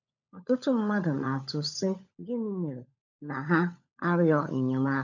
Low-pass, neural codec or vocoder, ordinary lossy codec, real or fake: 7.2 kHz; codec, 16 kHz, 16 kbps, FunCodec, trained on LibriTTS, 50 frames a second; none; fake